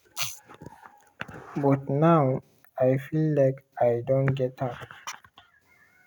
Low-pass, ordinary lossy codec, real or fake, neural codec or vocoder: none; none; real; none